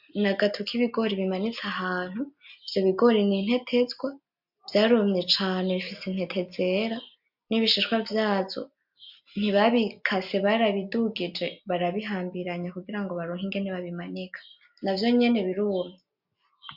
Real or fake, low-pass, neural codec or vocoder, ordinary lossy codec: real; 5.4 kHz; none; MP3, 48 kbps